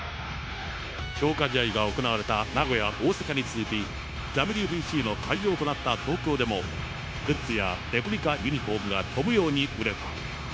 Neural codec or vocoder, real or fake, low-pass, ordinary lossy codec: codec, 16 kHz, 0.9 kbps, LongCat-Audio-Codec; fake; none; none